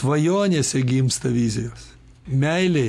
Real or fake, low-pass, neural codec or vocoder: real; 14.4 kHz; none